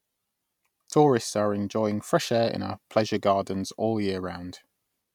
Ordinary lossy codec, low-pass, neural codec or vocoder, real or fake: none; 19.8 kHz; none; real